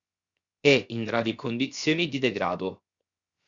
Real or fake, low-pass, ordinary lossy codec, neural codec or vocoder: fake; 7.2 kHz; Opus, 64 kbps; codec, 16 kHz, 0.7 kbps, FocalCodec